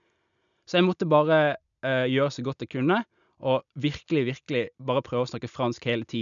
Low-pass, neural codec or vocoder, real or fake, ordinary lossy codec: 7.2 kHz; none; real; none